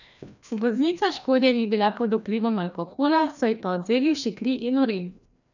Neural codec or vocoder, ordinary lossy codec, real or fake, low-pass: codec, 16 kHz, 1 kbps, FreqCodec, larger model; none; fake; 7.2 kHz